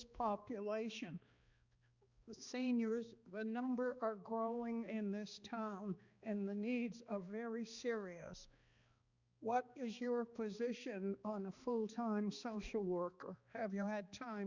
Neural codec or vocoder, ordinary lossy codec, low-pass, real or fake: codec, 16 kHz, 2 kbps, X-Codec, HuBERT features, trained on balanced general audio; Opus, 64 kbps; 7.2 kHz; fake